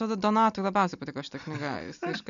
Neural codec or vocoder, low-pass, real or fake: none; 7.2 kHz; real